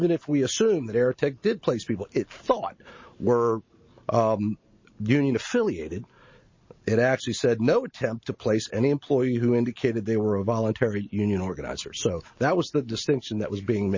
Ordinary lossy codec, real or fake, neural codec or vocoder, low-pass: MP3, 32 kbps; real; none; 7.2 kHz